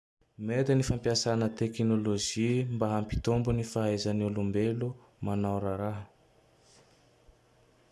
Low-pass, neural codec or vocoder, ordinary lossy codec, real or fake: none; none; none; real